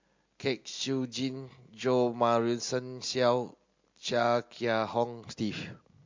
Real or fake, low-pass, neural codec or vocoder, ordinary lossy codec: real; 7.2 kHz; none; MP3, 48 kbps